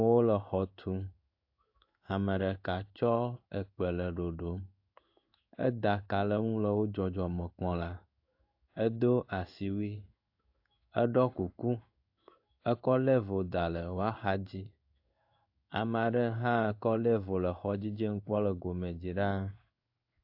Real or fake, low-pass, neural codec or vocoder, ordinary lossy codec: fake; 5.4 kHz; codec, 16 kHz in and 24 kHz out, 1 kbps, XY-Tokenizer; AAC, 48 kbps